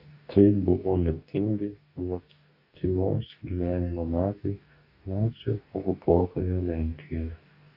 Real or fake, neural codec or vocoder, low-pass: fake; codec, 44.1 kHz, 2.6 kbps, DAC; 5.4 kHz